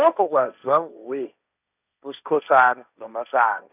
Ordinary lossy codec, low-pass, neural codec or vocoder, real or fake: none; 3.6 kHz; codec, 16 kHz, 1.1 kbps, Voila-Tokenizer; fake